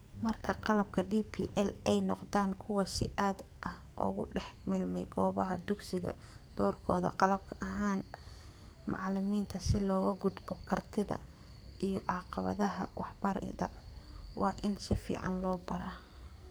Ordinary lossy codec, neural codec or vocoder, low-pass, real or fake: none; codec, 44.1 kHz, 2.6 kbps, SNAC; none; fake